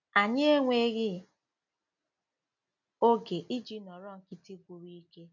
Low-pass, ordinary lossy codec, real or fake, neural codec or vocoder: 7.2 kHz; none; real; none